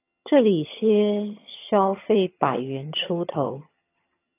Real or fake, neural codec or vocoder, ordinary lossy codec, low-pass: fake; vocoder, 22.05 kHz, 80 mel bands, HiFi-GAN; AAC, 24 kbps; 3.6 kHz